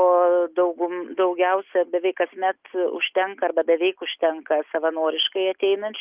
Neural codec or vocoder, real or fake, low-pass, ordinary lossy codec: none; real; 3.6 kHz; Opus, 24 kbps